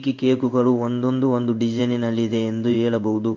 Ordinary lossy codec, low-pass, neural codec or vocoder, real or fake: MP3, 48 kbps; 7.2 kHz; codec, 16 kHz in and 24 kHz out, 1 kbps, XY-Tokenizer; fake